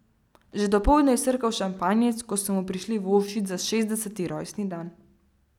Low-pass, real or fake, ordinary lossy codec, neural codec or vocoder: 19.8 kHz; real; none; none